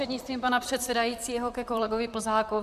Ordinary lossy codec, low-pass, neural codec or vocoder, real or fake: AAC, 96 kbps; 14.4 kHz; vocoder, 44.1 kHz, 128 mel bands, Pupu-Vocoder; fake